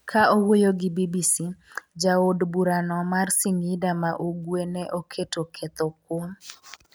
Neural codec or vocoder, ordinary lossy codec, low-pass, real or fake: none; none; none; real